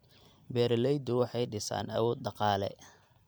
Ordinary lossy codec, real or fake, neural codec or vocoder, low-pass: none; fake; vocoder, 44.1 kHz, 128 mel bands every 256 samples, BigVGAN v2; none